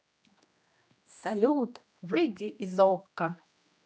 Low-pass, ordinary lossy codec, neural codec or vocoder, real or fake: none; none; codec, 16 kHz, 1 kbps, X-Codec, HuBERT features, trained on general audio; fake